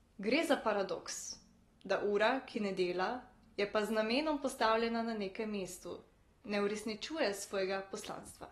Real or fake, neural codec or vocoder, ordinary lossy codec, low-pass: real; none; AAC, 32 kbps; 19.8 kHz